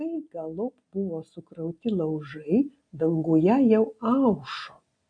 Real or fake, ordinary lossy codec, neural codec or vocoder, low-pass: real; AAC, 64 kbps; none; 9.9 kHz